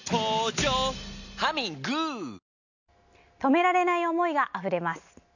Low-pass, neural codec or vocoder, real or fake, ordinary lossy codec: 7.2 kHz; none; real; none